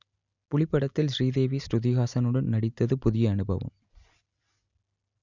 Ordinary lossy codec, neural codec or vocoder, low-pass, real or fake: none; none; 7.2 kHz; real